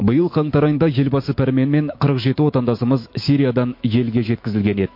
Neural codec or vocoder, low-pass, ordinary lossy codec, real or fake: autoencoder, 48 kHz, 128 numbers a frame, DAC-VAE, trained on Japanese speech; 5.4 kHz; MP3, 32 kbps; fake